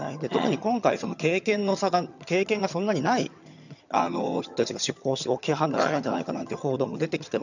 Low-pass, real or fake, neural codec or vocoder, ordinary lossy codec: 7.2 kHz; fake; vocoder, 22.05 kHz, 80 mel bands, HiFi-GAN; none